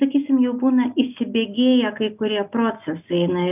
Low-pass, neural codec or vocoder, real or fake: 3.6 kHz; none; real